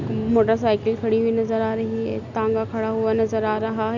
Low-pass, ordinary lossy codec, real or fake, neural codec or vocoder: 7.2 kHz; none; real; none